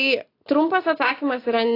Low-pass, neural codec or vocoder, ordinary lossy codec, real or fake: 5.4 kHz; none; AAC, 24 kbps; real